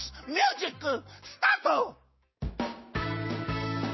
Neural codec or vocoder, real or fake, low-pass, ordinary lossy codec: codec, 16 kHz, 6 kbps, DAC; fake; 7.2 kHz; MP3, 24 kbps